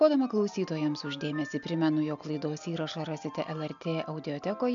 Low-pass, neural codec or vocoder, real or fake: 7.2 kHz; none; real